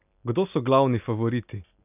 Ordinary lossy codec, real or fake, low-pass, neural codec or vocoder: none; real; 3.6 kHz; none